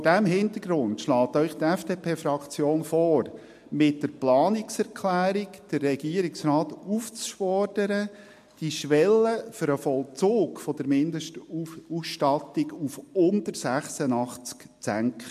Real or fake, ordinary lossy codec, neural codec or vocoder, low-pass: real; MP3, 64 kbps; none; 14.4 kHz